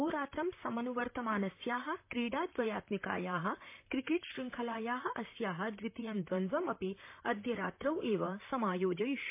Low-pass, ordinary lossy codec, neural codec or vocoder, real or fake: 3.6 kHz; MP3, 24 kbps; vocoder, 44.1 kHz, 128 mel bands, Pupu-Vocoder; fake